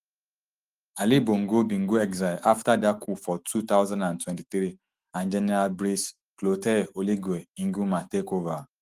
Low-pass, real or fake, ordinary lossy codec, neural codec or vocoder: 14.4 kHz; fake; Opus, 24 kbps; autoencoder, 48 kHz, 128 numbers a frame, DAC-VAE, trained on Japanese speech